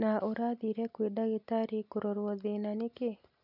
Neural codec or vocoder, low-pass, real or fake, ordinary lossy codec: none; 5.4 kHz; real; none